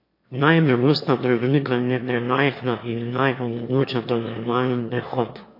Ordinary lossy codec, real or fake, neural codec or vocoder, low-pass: MP3, 32 kbps; fake; autoencoder, 22.05 kHz, a latent of 192 numbers a frame, VITS, trained on one speaker; 5.4 kHz